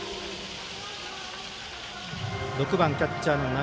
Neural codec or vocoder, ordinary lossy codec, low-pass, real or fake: none; none; none; real